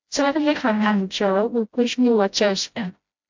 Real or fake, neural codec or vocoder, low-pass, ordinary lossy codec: fake; codec, 16 kHz, 0.5 kbps, FreqCodec, smaller model; 7.2 kHz; MP3, 64 kbps